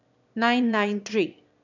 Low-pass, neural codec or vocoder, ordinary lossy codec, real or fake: 7.2 kHz; autoencoder, 22.05 kHz, a latent of 192 numbers a frame, VITS, trained on one speaker; none; fake